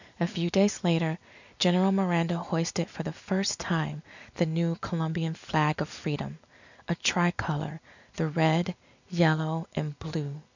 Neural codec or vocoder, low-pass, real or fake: none; 7.2 kHz; real